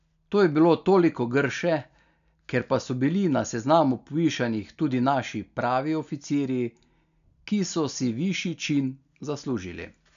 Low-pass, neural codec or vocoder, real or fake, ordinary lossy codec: 7.2 kHz; none; real; none